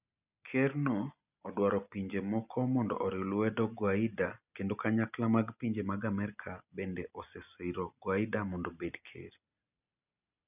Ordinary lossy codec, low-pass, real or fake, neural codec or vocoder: none; 3.6 kHz; real; none